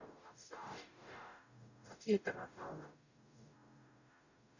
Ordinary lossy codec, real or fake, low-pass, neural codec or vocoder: none; fake; 7.2 kHz; codec, 44.1 kHz, 0.9 kbps, DAC